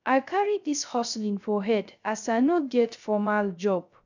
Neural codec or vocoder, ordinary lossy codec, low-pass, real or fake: codec, 16 kHz, 0.3 kbps, FocalCodec; none; 7.2 kHz; fake